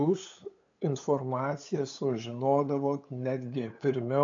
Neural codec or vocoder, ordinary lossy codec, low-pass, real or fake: codec, 16 kHz, 8 kbps, FunCodec, trained on LibriTTS, 25 frames a second; AAC, 64 kbps; 7.2 kHz; fake